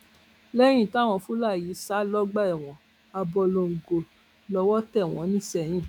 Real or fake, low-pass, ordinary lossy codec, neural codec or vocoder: fake; 19.8 kHz; MP3, 96 kbps; autoencoder, 48 kHz, 128 numbers a frame, DAC-VAE, trained on Japanese speech